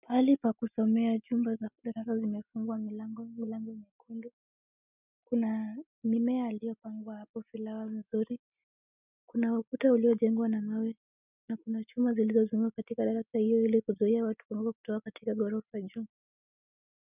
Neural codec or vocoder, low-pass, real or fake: none; 3.6 kHz; real